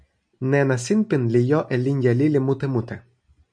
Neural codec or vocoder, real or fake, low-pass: none; real; 9.9 kHz